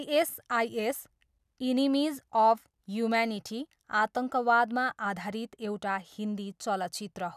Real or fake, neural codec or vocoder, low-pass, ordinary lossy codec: real; none; 14.4 kHz; none